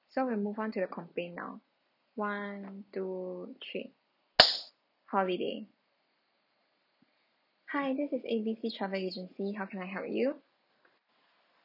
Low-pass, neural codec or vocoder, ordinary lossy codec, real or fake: 5.4 kHz; none; none; real